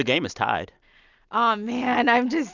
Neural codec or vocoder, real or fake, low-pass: none; real; 7.2 kHz